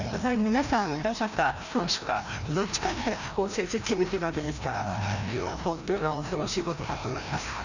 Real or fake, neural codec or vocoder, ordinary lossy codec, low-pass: fake; codec, 16 kHz, 1 kbps, FreqCodec, larger model; none; 7.2 kHz